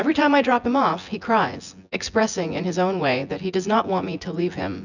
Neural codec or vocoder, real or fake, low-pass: vocoder, 24 kHz, 100 mel bands, Vocos; fake; 7.2 kHz